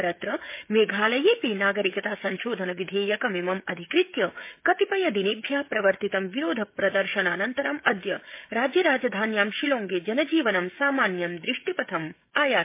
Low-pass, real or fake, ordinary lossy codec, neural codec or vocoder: 3.6 kHz; fake; MP3, 24 kbps; codec, 16 kHz, 16 kbps, FreqCodec, smaller model